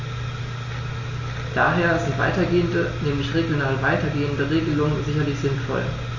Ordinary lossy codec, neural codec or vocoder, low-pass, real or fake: MP3, 32 kbps; none; 7.2 kHz; real